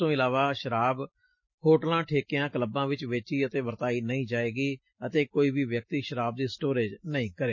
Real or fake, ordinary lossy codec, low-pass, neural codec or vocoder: real; MP3, 32 kbps; 7.2 kHz; none